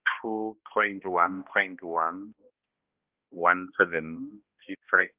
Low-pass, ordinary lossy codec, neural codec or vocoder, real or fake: 3.6 kHz; Opus, 24 kbps; codec, 16 kHz, 1 kbps, X-Codec, HuBERT features, trained on balanced general audio; fake